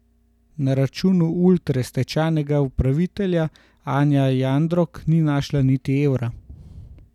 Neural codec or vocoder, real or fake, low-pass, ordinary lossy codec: none; real; 19.8 kHz; none